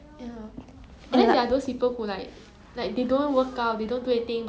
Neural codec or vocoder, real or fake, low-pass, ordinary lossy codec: none; real; none; none